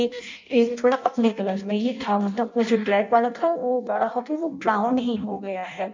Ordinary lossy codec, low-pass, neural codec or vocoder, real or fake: MP3, 64 kbps; 7.2 kHz; codec, 16 kHz in and 24 kHz out, 0.6 kbps, FireRedTTS-2 codec; fake